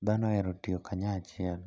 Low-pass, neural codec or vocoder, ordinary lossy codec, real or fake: none; none; none; real